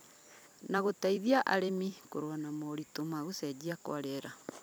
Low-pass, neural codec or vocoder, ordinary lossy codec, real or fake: none; vocoder, 44.1 kHz, 128 mel bands every 256 samples, BigVGAN v2; none; fake